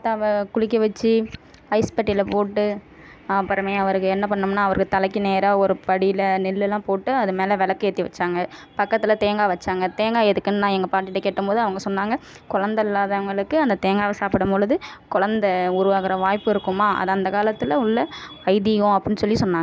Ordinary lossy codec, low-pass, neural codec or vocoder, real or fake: none; none; none; real